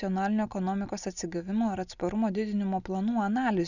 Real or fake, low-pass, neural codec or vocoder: real; 7.2 kHz; none